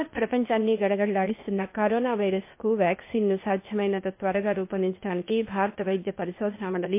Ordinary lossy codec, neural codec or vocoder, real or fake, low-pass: MP3, 24 kbps; codec, 16 kHz, 0.8 kbps, ZipCodec; fake; 3.6 kHz